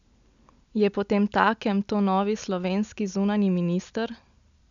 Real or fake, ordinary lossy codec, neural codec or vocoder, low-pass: real; none; none; 7.2 kHz